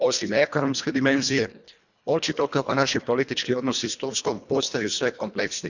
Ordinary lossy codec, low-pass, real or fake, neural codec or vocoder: none; 7.2 kHz; fake; codec, 24 kHz, 1.5 kbps, HILCodec